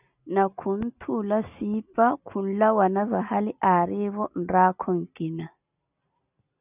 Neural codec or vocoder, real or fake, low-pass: none; real; 3.6 kHz